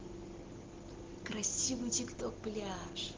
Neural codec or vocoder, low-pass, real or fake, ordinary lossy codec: none; 7.2 kHz; real; Opus, 16 kbps